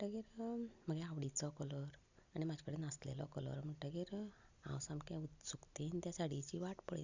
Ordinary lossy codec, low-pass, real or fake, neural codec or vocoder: Opus, 64 kbps; 7.2 kHz; real; none